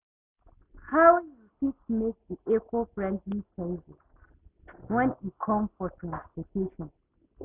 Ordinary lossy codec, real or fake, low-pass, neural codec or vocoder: none; real; 3.6 kHz; none